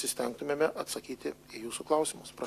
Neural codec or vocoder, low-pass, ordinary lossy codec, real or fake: none; 14.4 kHz; AAC, 64 kbps; real